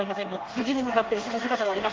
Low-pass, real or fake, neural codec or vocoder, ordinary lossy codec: 7.2 kHz; fake; codec, 24 kHz, 1 kbps, SNAC; Opus, 16 kbps